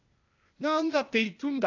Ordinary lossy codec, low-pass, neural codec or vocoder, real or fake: AAC, 48 kbps; 7.2 kHz; codec, 16 kHz, 0.8 kbps, ZipCodec; fake